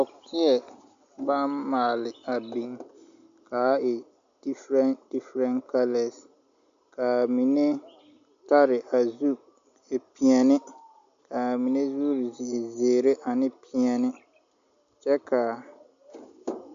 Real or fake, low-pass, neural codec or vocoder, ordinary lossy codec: real; 7.2 kHz; none; AAC, 64 kbps